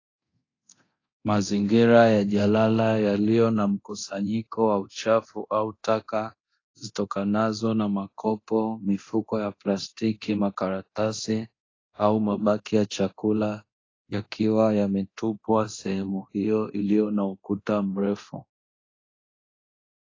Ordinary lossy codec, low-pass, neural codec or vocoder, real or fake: AAC, 32 kbps; 7.2 kHz; codec, 24 kHz, 0.9 kbps, DualCodec; fake